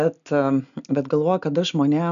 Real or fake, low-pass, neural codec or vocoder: real; 7.2 kHz; none